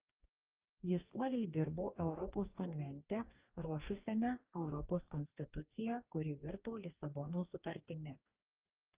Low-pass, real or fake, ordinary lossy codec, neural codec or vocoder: 3.6 kHz; fake; Opus, 24 kbps; codec, 44.1 kHz, 2.6 kbps, DAC